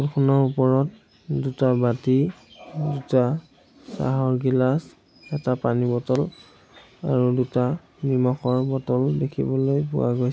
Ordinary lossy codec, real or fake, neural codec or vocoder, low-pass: none; real; none; none